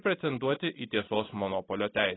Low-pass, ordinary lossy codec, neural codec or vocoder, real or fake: 7.2 kHz; AAC, 16 kbps; none; real